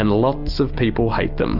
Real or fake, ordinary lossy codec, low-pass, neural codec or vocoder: real; Opus, 24 kbps; 5.4 kHz; none